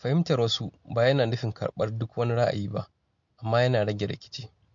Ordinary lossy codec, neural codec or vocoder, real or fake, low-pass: MP3, 48 kbps; none; real; 7.2 kHz